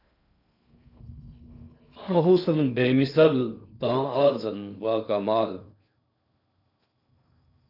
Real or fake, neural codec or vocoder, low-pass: fake; codec, 16 kHz in and 24 kHz out, 0.6 kbps, FocalCodec, streaming, 2048 codes; 5.4 kHz